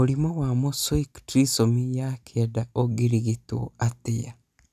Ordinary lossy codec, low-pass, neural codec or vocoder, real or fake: none; 14.4 kHz; none; real